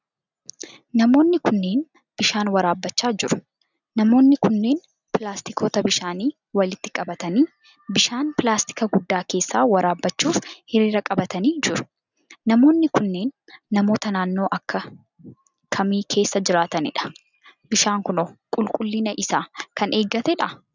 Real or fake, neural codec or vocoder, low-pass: real; none; 7.2 kHz